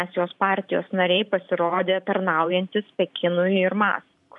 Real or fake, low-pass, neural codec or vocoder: fake; 10.8 kHz; vocoder, 44.1 kHz, 128 mel bands every 512 samples, BigVGAN v2